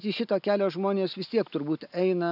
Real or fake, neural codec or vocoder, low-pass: real; none; 5.4 kHz